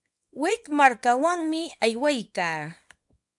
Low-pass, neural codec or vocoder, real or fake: 10.8 kHz; codec, 24 kHz, 0.9 kbps, WavTokenizer, small release; fake